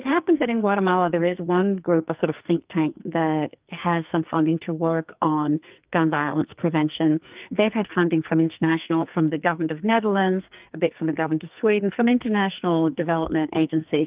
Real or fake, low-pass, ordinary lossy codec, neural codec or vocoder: fake; 3.6 kHz; Opus, 24 kbps; codec, 44.1 kHz, 2.6 kbps, SNAC